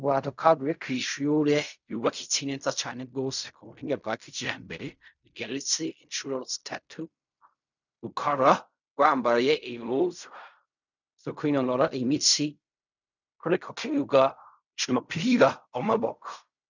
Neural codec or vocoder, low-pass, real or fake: codec, 16 kHz in and 24 kHz out, 0.4 kbps, LongCat-Audio-Codec, fine tuned four codebook decoder; 7.2 kHz; fake